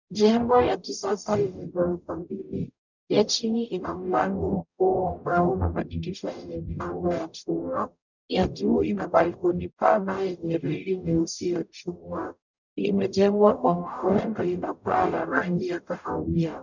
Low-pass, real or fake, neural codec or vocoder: 7.2 kHz; fake; codec, 44.1 kHz, 0.9 kbps, DAC